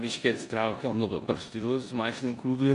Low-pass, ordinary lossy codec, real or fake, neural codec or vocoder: 10.8 kHz; AAC, 64 kbps; fake; codec, 16 kHz in and 24 kHz out, 0.9 kbps, LongCat-Audio-Codec, four codebook decoder